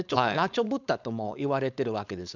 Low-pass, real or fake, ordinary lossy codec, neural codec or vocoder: 7.2 kHz; fake; none; codec, 16 kHz, 4.8 kbps, FACodec